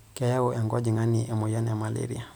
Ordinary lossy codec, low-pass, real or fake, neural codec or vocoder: none; none; real; none